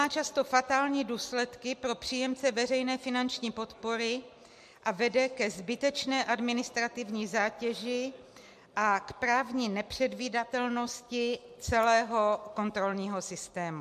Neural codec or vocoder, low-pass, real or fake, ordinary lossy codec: none; 14.4 kHz; real; MP3, 64 kbps